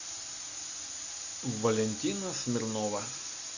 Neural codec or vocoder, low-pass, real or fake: none; 7.2 kHz; real